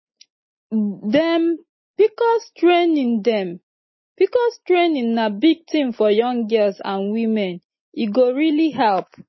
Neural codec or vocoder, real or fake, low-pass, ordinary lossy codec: none; real; 7.2 kHz; MP3, 24 kbps